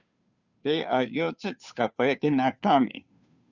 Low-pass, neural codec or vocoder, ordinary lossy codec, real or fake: 7.2 kHz; codec, 16 kHz, 2 kbps, FunCodec, trained on Chinese and English, 25 frames a second; Opus, 64 kbps; fake